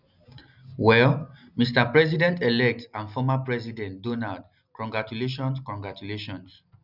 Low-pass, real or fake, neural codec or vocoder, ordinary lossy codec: 5.4 kHz; real; none; none